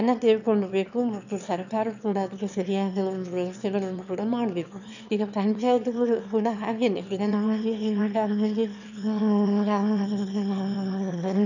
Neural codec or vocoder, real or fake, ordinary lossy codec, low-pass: autoencoder, 22.05 kHz, a latent of 192 numbers a frame, VITS, trained on one speaker; fake; none; 7.2 kHz